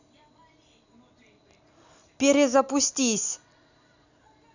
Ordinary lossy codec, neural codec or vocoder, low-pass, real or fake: none; none; 7.2 kHz; real